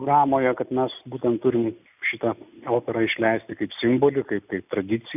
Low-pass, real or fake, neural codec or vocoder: 3.6 kHz; real; none